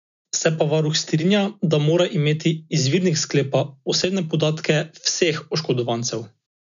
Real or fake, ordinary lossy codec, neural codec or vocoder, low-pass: real; none; none; 7.2 kHz